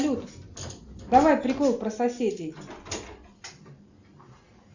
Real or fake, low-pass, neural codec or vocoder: real; 7.2 kHz; none